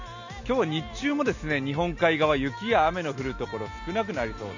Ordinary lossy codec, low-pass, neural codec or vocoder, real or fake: none; 7.2 kHz; none; real